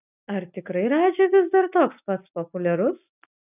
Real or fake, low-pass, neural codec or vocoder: real; 3.6 kHz; none